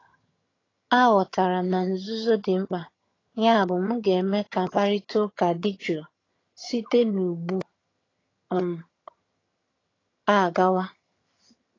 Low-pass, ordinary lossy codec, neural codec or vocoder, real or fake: 7.2 kHz; AAC, 32 kbps; vocoder, 22.05 kHz, 80 mel bands, HiFi-GAN; fake